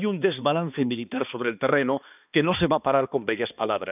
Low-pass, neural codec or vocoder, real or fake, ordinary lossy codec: 3.6 kHz; codec, 16 kHz, 2 kbps, X-Codec, HuBERT features, trained on balanced general audio; fake; none